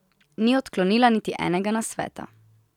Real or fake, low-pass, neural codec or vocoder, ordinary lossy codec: real; 19.8 kHz; none; none